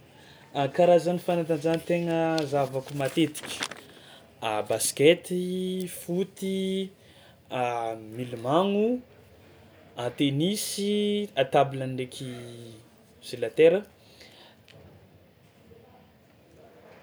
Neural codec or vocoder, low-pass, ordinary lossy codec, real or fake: none; none; none; real